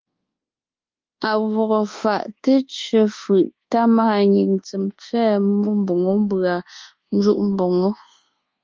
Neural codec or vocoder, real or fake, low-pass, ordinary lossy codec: codec, 24 kHz, 1.2 kbps, DualCodec; fake; 7.2 kHz; Opus, 32 kbps